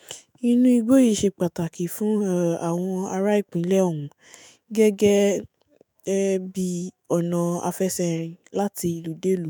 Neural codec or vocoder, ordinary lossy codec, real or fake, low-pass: autoencoder, 48 kHz, 128 numbers a frame, DAC-VAE, trained on Japanese speech; none; fake; none